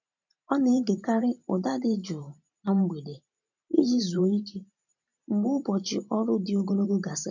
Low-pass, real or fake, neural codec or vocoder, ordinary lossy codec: 7.2 kHz; fake; vocoder, 44.1 kHz, 128 mel bands every 512 samples, BigVGAN v2; none